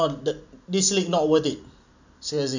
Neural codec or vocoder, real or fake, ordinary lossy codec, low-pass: none; real; none; 7.2 kHz